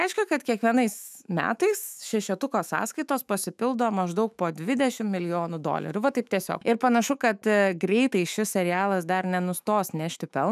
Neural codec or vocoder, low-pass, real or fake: autoencoder, 48 kHz, 128 numbers a frame, DAC-VAE, trained on Japanese speech; 14.4 kHz; fake